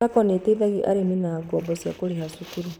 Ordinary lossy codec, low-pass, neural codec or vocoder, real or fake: none; none; vocoder, 44.1 kHz, 128 mel bands, Pupu-Vocoder; fake